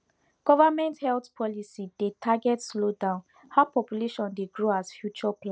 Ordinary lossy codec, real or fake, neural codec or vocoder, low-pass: none; real; none; none